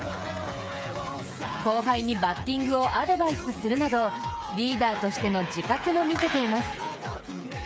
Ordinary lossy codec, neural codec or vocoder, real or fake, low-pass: none; codec, 16 kHz, 8 kbps, FreqCodec, smaller model; fake; none